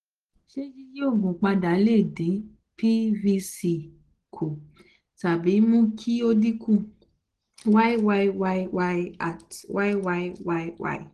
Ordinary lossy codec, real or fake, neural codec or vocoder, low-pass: Opus, 16 kbps; real; none; 10.8 kHz